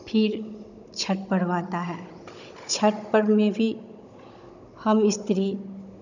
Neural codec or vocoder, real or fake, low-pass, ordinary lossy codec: codec, 16 kHz, 16 kbps, FunCodec, trained on Chinese and English, 50 frames a second; fake; 7.2 kHz; none